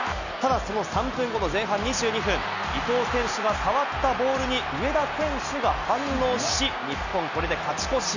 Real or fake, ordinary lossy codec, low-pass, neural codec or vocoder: real; none; 7.2 kHz; none